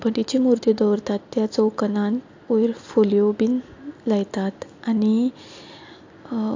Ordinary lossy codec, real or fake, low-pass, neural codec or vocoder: AAC, 48 kbps; real; 7.2 kHz; none